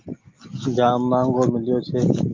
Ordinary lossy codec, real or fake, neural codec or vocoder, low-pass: Opus, 24 kbps; real; none; 7.2 kHz